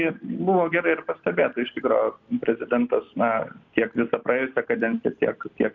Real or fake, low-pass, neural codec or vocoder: real; 7.2 kHz; none